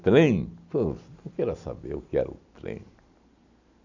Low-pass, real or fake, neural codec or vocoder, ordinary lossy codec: 7.2 kHz; real; none; none